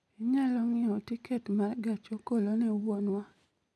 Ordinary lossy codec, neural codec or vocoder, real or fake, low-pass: none; none; real; none